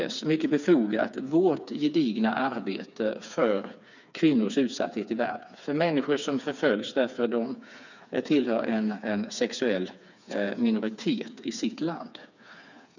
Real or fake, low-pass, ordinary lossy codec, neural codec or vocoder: fake; 7.2 kHz; none; codec, 16 kHz, 4 kbps, FreqCodec, smaller model